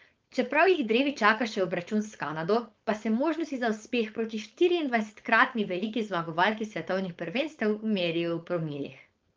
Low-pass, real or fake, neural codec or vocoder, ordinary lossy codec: 7.2 kHz; fake; codec, 16 kHz, 4.8 kbps, FACodec; Opus, 24 kbps